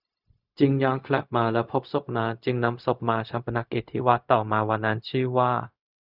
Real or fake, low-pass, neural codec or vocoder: fake; 5.4 kHz; codec, 16 kHz, 0.4 kbps, LongCat-Audio-Codec